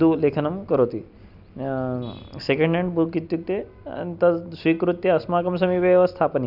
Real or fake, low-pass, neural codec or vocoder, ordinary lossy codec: real; 5.4 kHz; none; none